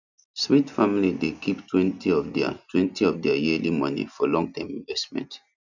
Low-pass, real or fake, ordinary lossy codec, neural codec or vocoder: 7.2 kHz; real; none; none